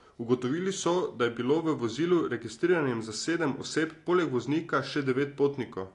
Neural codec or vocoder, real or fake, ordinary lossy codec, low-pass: none; real; AAC, 48 kbps; 10.8 kHz